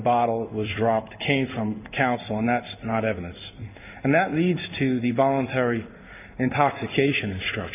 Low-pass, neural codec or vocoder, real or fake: 3.6 kHz; codec, 16 kHz in and 24 kHz out, 1 kbps, XY-Tokenizer; fake